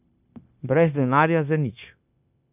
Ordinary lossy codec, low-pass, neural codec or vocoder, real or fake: none; 3.6 kHz; codec, 16 kHz, 0.9 kbps, LongCat-Audio-Codec; fake